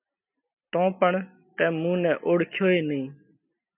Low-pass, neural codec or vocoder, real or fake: 3.6 kHz; none; real